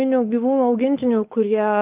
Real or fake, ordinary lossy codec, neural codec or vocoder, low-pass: fake; Opus, 32 kbps; autoencoder, 48 kHz, 128 numbers a frame, DAC-VAE, trained on Japanese speech; 3.6 kHz